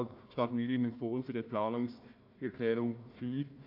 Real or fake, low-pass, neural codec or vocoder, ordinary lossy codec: fake; 5.4 kHz; codec, 16 kHz, 1 kbps, FunCodec, trained on Chinese and English, 50 frames a second; AAC, 48 kbps